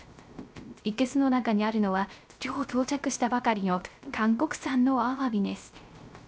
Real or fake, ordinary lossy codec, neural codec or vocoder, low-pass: fake; none; codec, 16 kHz, 0.3 kbps, FocalCodec; none